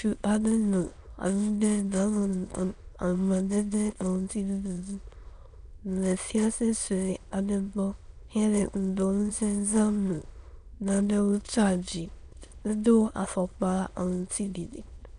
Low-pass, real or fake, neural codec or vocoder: 9.9 kHz; fake; autoencoder, 22.05 kHz, a latent of 192 numbers a frame, VITS, trained on many speakers